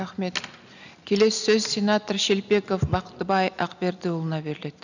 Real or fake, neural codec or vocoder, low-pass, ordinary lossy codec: real; none; 7.2 kHz; none